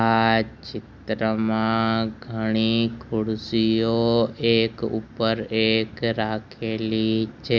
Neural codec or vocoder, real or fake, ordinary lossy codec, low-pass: none; real; none; none